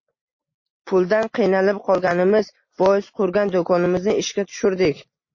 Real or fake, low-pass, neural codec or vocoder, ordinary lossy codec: real; 7.2 kHz; none; MP3, 32 kbps